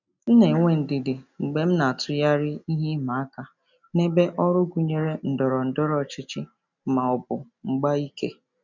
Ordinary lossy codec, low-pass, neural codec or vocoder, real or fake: none; 7.2 kHz; none; real